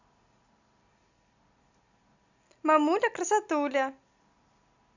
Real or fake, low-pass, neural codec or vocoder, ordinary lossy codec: real; 7.2 kHz; none; none